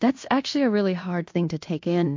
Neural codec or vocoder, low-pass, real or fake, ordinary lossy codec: codec, 16 kHz in and 24 kHz out, 0.9 kbps, LongCat-Audio-Codec, fine tuned four codebook decoder; 7.2 kHz; fake; MP3, 48 kbps